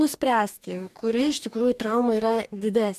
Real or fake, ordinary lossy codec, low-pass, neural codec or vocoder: fake; MP3, 96 kbps; 14.4 kHz; codec, 44.1 kHz, 2.6 kbps, DAC